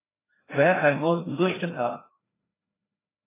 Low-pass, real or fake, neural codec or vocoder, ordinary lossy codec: 3.6 kHz; fake; codec, 16 kHz, 1 kbps, FreqCodec, larger model; AAC, 16 kbps